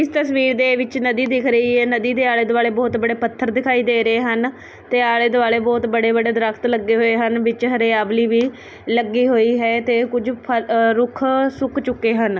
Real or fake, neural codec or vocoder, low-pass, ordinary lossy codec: real; none; none; none